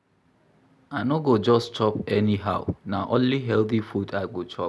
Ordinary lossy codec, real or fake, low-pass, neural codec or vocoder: none; real; none; none